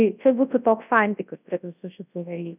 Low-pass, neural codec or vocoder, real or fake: 3.6 kHz; codec, 24 kHz, 0.9 kbps, WavTokenizer, large speech release; fake